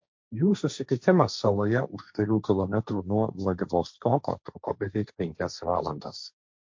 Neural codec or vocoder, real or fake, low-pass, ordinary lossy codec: codec, 16 kHz, 1.1 kbps, Voila-Tokenizer; fake; 7.2 kHz; MP3, 48 kbps